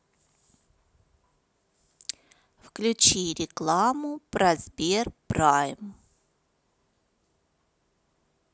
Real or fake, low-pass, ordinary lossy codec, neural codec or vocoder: real; none; none; none